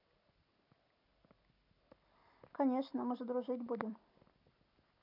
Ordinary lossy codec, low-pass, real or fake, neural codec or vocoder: none; 5.4 kHz; real; none